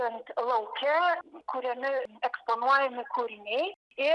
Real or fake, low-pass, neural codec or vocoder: real; 10.8 kHz; none